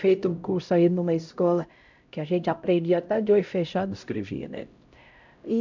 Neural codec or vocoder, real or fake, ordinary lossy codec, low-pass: codec, 16 kHz, 0.5 kbps, X-Codec, HuBERT features, trained on LibriSpeech; fake; MP3, 64 kbps; 7.2 kHz